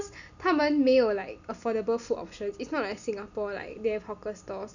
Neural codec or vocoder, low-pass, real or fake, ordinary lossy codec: none; 7.2 kHz; real; none